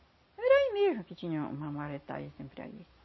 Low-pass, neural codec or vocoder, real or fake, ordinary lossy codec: 7.2 kHz; none; real; MP3, 24 kbps